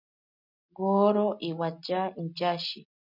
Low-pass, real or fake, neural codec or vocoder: 5.4 kHz; real; none